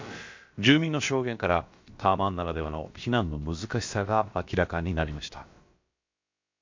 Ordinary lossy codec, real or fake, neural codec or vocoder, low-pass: MP3, 48 kbps; fake; codec, 16 kHz, about 1 kbps, DyCAST, with the encoder's durations; 7.2 kHz